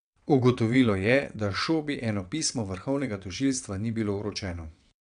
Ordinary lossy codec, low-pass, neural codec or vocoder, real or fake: none; 9.9 kHz; vocoder, 22.05 kHz, 80 mel bands, WaveNeXt; fake